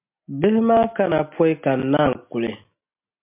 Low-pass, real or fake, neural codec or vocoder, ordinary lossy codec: 3.6 kHz; real; none; MP3, 32 kbps